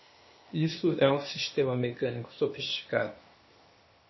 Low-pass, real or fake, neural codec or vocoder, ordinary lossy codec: 7.2 kHz; fake; codec, 16 kHz, 0.8 kbps, ZipCodec; MP3, 24 kbps